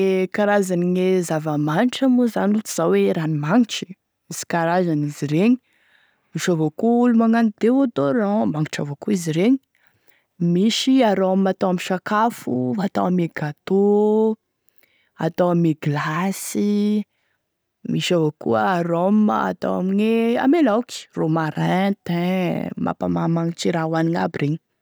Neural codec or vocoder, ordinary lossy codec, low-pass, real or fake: none; none; none; real